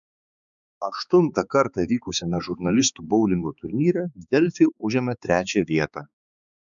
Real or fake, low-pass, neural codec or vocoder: fake; 7.2 kHz; codec, 16 kHz, 4 kbps, X-Codec, HuBERT features, trained on balanced general audio